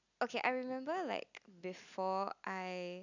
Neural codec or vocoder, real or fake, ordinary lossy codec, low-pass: none; real; none; 7.2 kHz